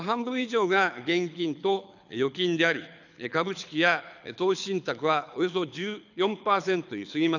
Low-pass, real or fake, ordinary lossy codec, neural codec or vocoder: 7.2 kHz; fake; none; codec, 24 kHz, 6 kbps, HILCodec